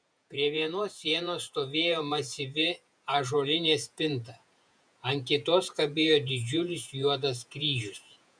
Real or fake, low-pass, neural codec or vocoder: fake; 9.9 kHz; vocoder, 48 kHz, 128 mel bands, Vocos